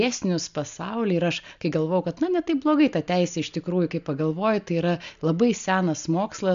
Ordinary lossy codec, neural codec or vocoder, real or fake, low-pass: MP3, 64 kbps; none; real; 7.2 kHz